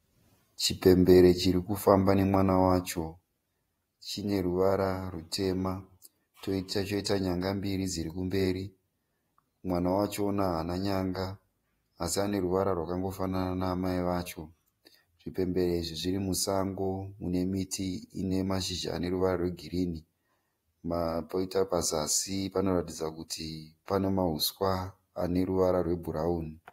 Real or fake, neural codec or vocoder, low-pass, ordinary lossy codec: fake; vocoder, 48 kHz, 128 mel bands, Vocos; 19.8 kHz; AAC, 48 kbps